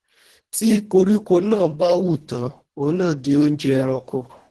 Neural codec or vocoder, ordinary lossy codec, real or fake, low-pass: codec, 24 kHz, 1.5 kbps, HILCodec; Opus, 16 kbps; fake; 10.8 kHz